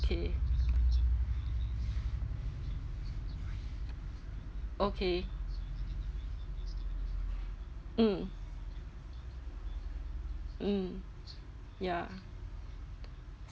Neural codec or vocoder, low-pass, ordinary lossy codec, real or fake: none; none; none; real